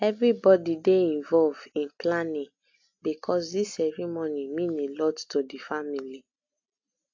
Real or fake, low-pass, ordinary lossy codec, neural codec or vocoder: real; 7.2 kHz; AAC, 48 kbps; none